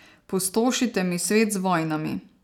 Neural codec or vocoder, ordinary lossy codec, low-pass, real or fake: none; none; 19.8 kHz; real